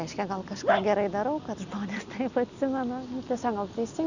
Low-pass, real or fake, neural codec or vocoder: 7.2 kHz; real; none